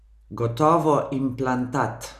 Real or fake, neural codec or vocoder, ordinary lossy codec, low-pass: real; none; none; 14.4 kHz